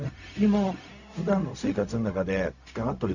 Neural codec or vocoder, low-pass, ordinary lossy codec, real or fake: codec, 16 kHz, 0.4 kbps, LongCat-Audio-Codec; 7.2 kHz; none; fake